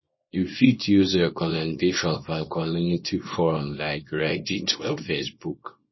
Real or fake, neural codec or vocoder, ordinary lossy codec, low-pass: fake; codec, 24 kHz, 0.9 kbps, WavTokenizer, small release; MP3, 24 kbps; 7.2 kHz